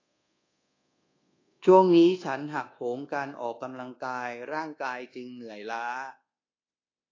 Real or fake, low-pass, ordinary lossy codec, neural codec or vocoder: fake; 7.2 kHz; AAC, 32 kbps; codec, 24 kHz, 1.2 kbps, DualCodec